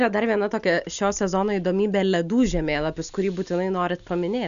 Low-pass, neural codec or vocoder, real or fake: 7.2 kHz; none; real